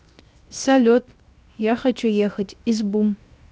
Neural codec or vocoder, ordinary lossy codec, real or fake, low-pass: codec, 16 kHz, 0.7 kbps, FocalCodec; none; fake; none